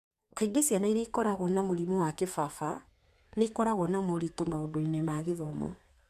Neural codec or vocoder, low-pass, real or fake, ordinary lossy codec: codec, 32 kHz, 1.9 kbps, SNAC; 14.4 kHz; fake; none